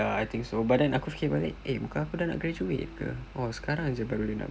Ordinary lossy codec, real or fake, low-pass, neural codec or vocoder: none; real; none; none